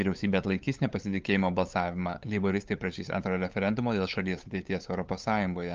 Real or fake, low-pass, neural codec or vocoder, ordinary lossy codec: fake; 7.2 kHz; codec, 16 kHz, 8 kbps, FunCodec, trained on LibriTTS, 25 frames a second; Opus, 24 kbps